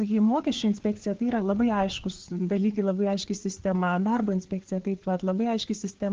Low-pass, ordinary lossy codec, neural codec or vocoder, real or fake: 7.2 kHz; Opus, 16 kbps; codec, 16 kHz, 4 kbps, FreqCodec, larger model; fake